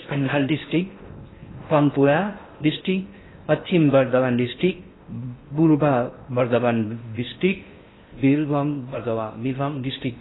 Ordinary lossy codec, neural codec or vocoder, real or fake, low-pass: AAC, 16 kbps; codec, 16 kHz in and 24 kHz out, 0.6 kbps, FocalCodec, streaming, 4096 codes; fake; 7.2 kHz